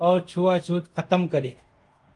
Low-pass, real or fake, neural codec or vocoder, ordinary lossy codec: 10.8 kHz; fake; codec, 24 kHz, 0.5 kbps, DualCodec; Opus, 32 kbps